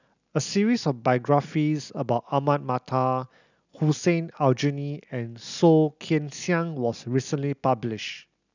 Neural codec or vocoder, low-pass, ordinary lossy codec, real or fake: none; 7.2 kHz; none; real